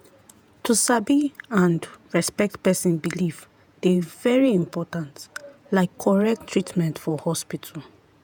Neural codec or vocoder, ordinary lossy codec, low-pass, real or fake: vocoder, 48 kHz, 128 mel bands, Vocos; none; none; fake